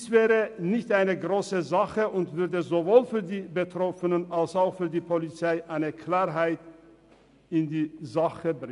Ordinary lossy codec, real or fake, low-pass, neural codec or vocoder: MP3, 96 kbps; real; 10.8 kHz; none